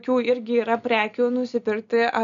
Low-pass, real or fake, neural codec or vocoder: 7.2 kHz; real; none